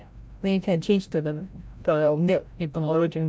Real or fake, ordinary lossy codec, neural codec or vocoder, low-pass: fake; none; codec, 16 kHz, 0.5 kbps, FreqCodec, larger model; none